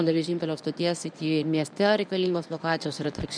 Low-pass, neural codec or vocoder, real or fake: 9.9 kHz; codec, 24 kHz, 0.9 kbps, WavTokenizer, medium speech release version 1; fake